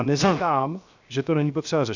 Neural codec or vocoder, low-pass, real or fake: codec, 16 kHz, 0.7 kbps, FocalCodec; 7.2 kHz; fake